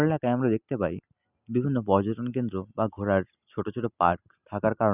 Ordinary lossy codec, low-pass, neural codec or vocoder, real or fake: none; 3.6 kHz; none; real